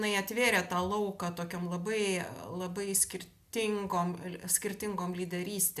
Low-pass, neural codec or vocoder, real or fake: 14.4 kHz; none; real